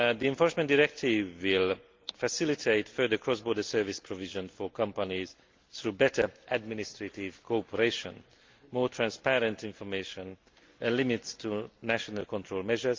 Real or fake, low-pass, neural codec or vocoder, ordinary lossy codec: real; 7.2 kHz; none; Opus, 24 kbps